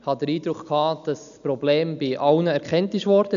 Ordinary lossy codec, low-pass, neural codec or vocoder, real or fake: none; 7.2 kHz; none; real